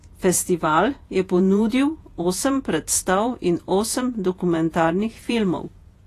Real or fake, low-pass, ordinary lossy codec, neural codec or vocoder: fake; 14.4 kHz; AAC, 48 kbps; vocoder, 48 kHz, 128 mel bands, Vocos